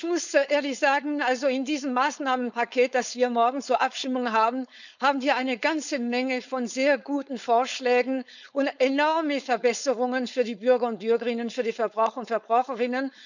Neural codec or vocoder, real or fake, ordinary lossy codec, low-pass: codec, 16 kHz, 4.8 kbps, FACodec; fake; none; 7.2 kHz